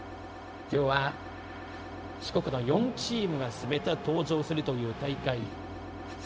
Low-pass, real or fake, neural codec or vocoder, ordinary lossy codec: none; fake; codec, 16 kHz, 0.4 kbps, LongCat-Audio-Codec; none